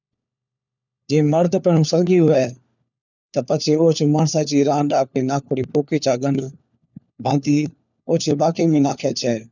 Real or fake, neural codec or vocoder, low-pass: fake; codec, 16 kHz, 4 kbps, FunCodec, trained on LibriTTS, 50 frames a second; 7.2 kHz